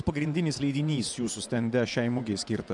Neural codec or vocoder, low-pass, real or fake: vocoder, 24 kHz, 100 mel bands, Vocos; 10.8 kHz; fake